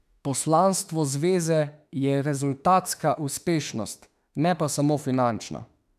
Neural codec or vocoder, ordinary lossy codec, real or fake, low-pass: autoencoder, 48 kHz, 32 numbers a frame, DAC-VAE, trained on Japanese speech; none; fake; 14.4 kHz